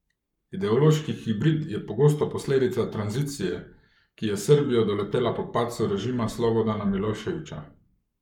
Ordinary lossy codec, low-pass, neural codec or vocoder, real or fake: none; 19.8 kHz; codec, 44.1 kHz, 7.8 kbps, Pupu-Codec; fake